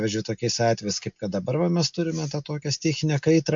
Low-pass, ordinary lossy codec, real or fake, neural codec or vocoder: 7.2 kHz; MP3, 64 kbps; real; none